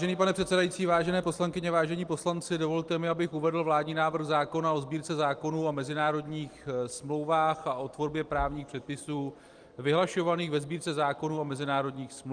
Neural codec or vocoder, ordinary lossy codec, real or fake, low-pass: none; Opus, 32 kbps; real; 9.9 kHz